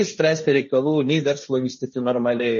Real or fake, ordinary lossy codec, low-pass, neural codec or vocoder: fake; MP3, 32 kbps; 7.2 kHz; codec, 16 kHz, 1.1 kbps, Voila-Tokenizer